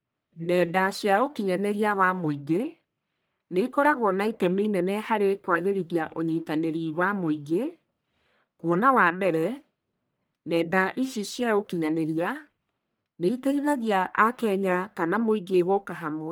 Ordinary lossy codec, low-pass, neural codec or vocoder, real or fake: none; none; codec, 44.1 kHz, 1.7 kbps, Pupu-Codec; fake